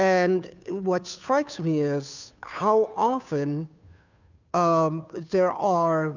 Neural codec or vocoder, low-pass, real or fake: codec, 16 kHz, 2 kbps, FunCodec, trained on Chinese and English, 25 frames a second; 7.2 kHz; fake